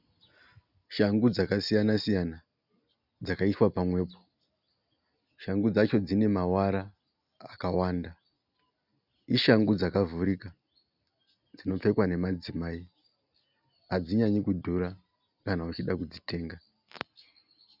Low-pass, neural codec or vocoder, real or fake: 5.4 kHz; none; real